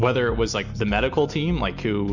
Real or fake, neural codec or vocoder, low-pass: real; none; 7.2 kHz